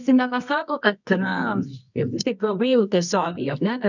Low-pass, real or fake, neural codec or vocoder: 7.2 kHz; fake; codec, 24 kHz, 0.9 kbps, WavTokenizer, medium music audio release